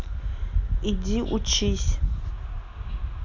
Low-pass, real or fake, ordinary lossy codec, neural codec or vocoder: 7.2 kHz; real; AAC, 48 kbps; none